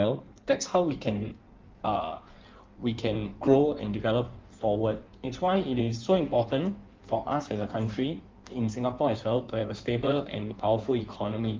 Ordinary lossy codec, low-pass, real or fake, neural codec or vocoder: Opus, 32 kbps; 7.2 kHz; fake; codec, 16 kHz in and 24 kHz out, 1.1 kbps, FireRedTTS-2 codec